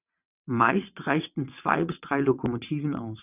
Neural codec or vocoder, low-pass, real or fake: vocoder, 22.05 kHz, 80 mel bands, WaveNeXt; 3.6 kHz; fake